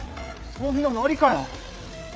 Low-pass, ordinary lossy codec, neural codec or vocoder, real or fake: none; none; codec, 16 kHz, 8 kbps, FreqCodec, larger model; fake